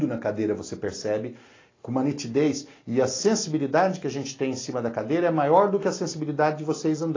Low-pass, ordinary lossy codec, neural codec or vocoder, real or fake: 7.2 kHz; AAC, 32 kbps; none; real